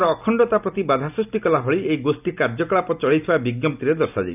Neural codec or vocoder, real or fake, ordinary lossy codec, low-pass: none; real; none; 3.6 kHz